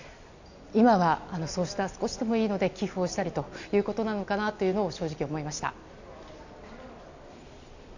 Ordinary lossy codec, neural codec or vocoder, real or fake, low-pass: none; none; real; 7.2 kHz